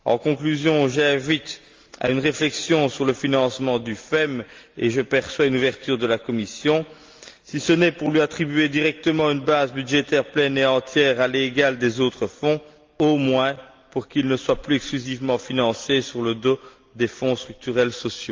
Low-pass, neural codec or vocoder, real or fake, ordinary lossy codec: 7.2 kHz; none; real; Opus, 32 kbps